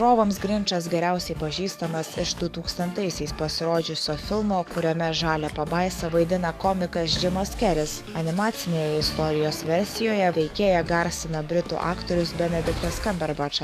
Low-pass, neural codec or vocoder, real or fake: 14.4 kHz; codec, 44.1 kHz, 7.8 kbps, DAC; fake